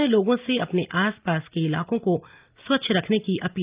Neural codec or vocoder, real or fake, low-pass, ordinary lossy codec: none; real; 3.6 kHz; Opus, 32 kbps